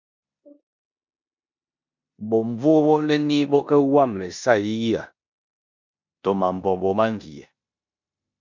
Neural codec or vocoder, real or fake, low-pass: codec, 16 kHz in and 24 kHz out, 0.9 kbps, LongCat-Audio-Codec, four codebook decoder; fake; 7.2 kHz